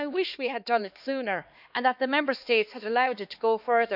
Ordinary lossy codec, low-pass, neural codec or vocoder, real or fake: none; 5.4 kHz; codec, 16 kHz, 2 kbps, X-Codec, HuBERT features, trained on LibriSpeech; fake